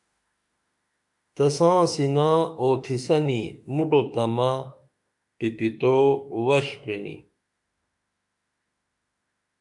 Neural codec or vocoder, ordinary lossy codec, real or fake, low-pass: autoencoder, 48 kHz, 32 numbers a frame, DAC-VAE, trained on Japanese speech; MP3, 96 kbps; fake; 10.8 kHz